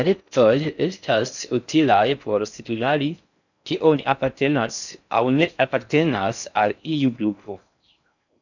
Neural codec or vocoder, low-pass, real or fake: codec, 16 kHz in and 24 kHz out, 0.6 kbps, FocalCodec, streaming, 4096 codes; 7.2 kHz; fake